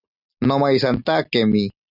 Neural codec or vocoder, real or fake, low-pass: none; real; 5.4 kHz